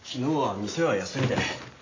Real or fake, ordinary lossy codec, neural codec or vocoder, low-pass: real; AAC, 32 kbps; none; 7.2 kHz